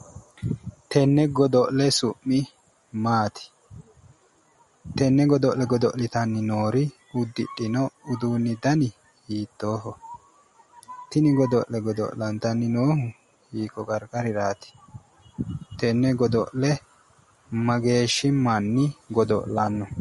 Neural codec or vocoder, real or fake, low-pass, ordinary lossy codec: none; real; 19.8 kHz; MP3, 48 kbps